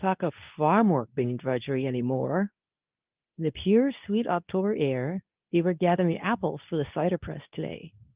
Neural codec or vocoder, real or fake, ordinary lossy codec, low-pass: codec, 24 kHz, 0.9 kbps, WavTokenizer, medium speech release version 2; fake; Opus, 24 kbps; 3.6 kHz